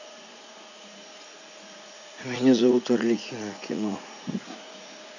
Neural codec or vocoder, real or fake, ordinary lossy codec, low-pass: vocoder, 44.1 kHz, 80 mel bands, Vocos; fake; none; 7.2 kHz